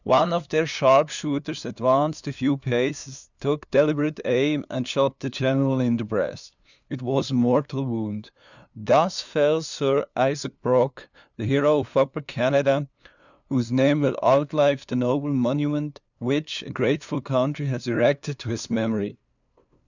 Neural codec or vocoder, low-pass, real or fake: codec, 16 kHz, 2 kbps, FunCodec, trained on LibriTTS, 25 frames a second; 7.2 kHz; fake